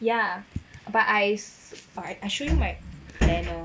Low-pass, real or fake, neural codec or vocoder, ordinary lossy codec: none; real; none; none